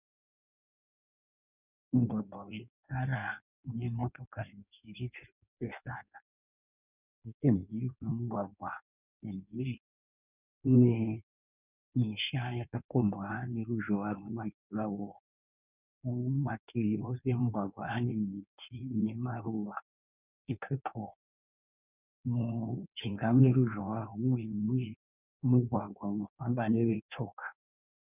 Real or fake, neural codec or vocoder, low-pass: fake; codec, 16 kHz in and 24 kHz out, 1.1 kbps, FireRedTTS-2 codec; 3.6 kHz